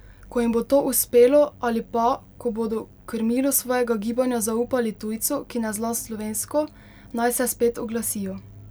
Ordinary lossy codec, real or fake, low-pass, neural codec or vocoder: none; real; none; none